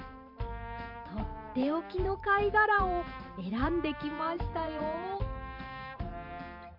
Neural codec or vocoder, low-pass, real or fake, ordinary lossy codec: vocoder, 44.1 kHz, 128 mel bands every 512 samples, BigVGAN v2; 5.4 kHz; fake; MP3, 48 kbps